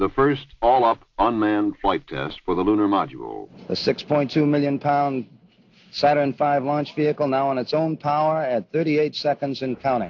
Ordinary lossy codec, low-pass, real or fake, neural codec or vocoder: AAC, 48 kbps; 7.2 kHz; real; none